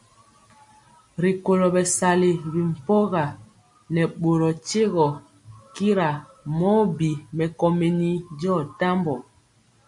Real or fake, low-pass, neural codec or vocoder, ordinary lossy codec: real; 10.8 kHz; none; MP3, 64 kbps